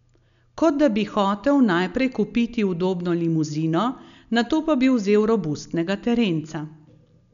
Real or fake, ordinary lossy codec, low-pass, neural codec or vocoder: real; none; 7.2 kHz; none